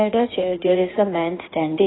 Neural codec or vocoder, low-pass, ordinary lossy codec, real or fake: codec, 16 kHz in and 24 kHz out, 1.1 kbps, FireRedTTS-2 codec; 7.2 kHz; AAC, 16 kbps; fake